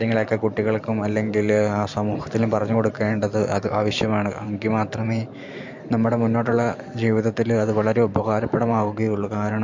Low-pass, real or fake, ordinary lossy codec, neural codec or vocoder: 7.2 kHz; fake; MP3, 48 kbps; vocoder, 44.1 kHz, 128 mel bands every 512 samples, BigVGAN v2